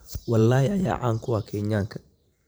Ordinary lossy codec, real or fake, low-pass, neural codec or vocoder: none; fake; none; vocoder, 44.1 kHz, 128 mel bands every 512 samples, BigVGAN v2